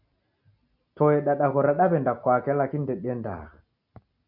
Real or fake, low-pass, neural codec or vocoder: real; 5.4 kHz; none